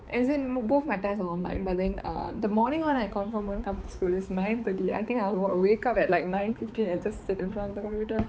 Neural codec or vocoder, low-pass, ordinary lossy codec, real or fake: codec, 16 kHz, 4 kbps, X-Codec, HuBERT features, trained on balanced general audio; none; none; fake